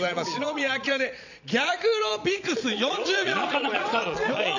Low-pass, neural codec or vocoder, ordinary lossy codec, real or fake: 7.2 kHz; vocoder, 22.05 kHz, 80 mel bands, Vocos; none; fake